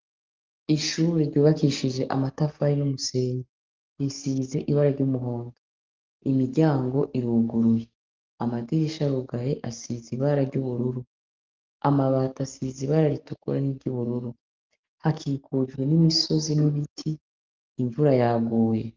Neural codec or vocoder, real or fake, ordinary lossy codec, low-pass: codec, 44.1 kHz, 7.8 kbps, DAC; fake; Opus, 32 kbps; 7.2 kHz